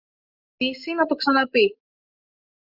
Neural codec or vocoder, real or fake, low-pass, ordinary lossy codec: none; real; 5.4 kHz; Opus, 64 kbps